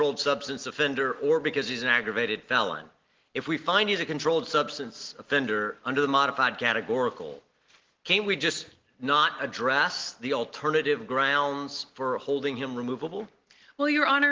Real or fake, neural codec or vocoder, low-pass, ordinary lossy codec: real; none; 7.2 kHz; Opus, 16 kbps